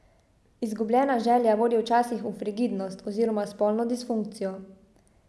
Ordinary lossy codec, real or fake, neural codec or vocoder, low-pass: none; real; none; none